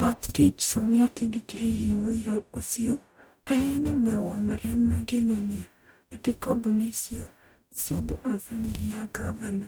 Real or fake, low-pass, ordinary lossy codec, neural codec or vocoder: fake; none; none; codec, 44.1 kHz, 0.9 kbps, DAC